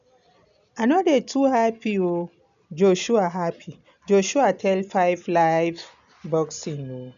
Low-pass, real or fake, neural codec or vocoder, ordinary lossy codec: 7.2 kHz; real; none; none